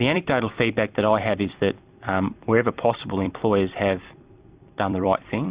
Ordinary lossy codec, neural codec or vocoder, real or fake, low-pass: Opus, 64 kbps; vocoder, 44.1 kHz, 128 mel bands every 256 samples, BigVGAN v2; fake; 3.6 kHz